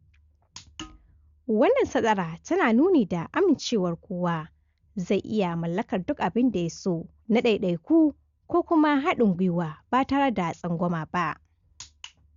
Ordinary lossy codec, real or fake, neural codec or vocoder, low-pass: Opus, 64 kbps; real; none; 7.2 kHz